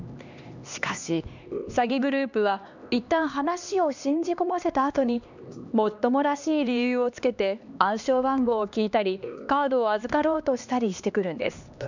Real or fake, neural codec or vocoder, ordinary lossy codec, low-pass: fake; codec, 16 kHz, 2 kbps, X-Codec, HuBERT features, trained on LibriSpeech; none; 7.2 kHz